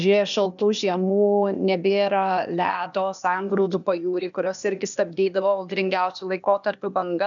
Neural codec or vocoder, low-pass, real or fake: codec, 16 kHz, 0.8 kbps, ZipCodec; 7.2 kHz; fake